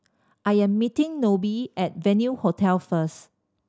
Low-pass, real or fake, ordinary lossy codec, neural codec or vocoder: none; real; none; none